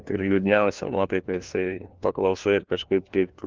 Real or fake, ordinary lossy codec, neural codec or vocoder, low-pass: fake; Opus, 16 kbps; codec, 16 kHz, 1 kbps, FunCodec, trained on LibriTTS, 50 frames a second; 7.2 kHz